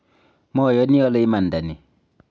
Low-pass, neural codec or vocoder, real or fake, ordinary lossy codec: none; none; real; none